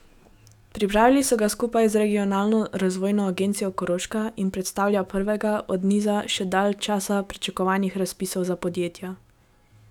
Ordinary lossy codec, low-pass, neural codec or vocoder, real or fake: none; 19.8 kHz; autoencoder, 48 kHz, 128 numbers a frame, DAC-VAE, trained on Japanese speech; fake